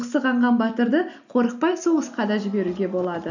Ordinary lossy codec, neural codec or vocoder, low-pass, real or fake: none; none; 7.2 kHz; real